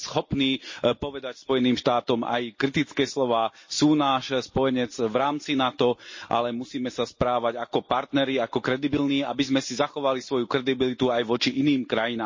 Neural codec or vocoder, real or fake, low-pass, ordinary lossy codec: none; real; 7.2 kHz; MP3, 32 kbps